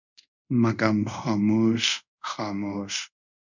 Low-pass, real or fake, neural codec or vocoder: 7.2 kHz; fake; codec, 24 kHz, 0.5 kbps, DualCodec